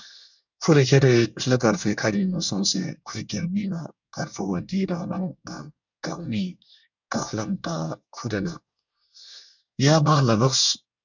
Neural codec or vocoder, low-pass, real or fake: codec, 24 kHz, 1 kbps, SNAC; 7.2 kHz; fake